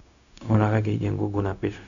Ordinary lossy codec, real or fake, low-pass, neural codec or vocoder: none; fake; 7.2 kHz; codec, 16 kHz, 0.4 kbps, LongCat-Audio-Codec